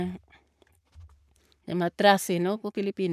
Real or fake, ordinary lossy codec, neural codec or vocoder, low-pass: fake; none; codec, 44.1 kHz, 7.8 kbps, Pupu-Codec; 14.4 kHz